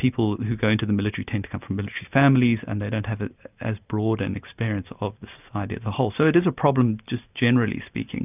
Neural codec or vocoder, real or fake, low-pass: none; real; 3.6 kHz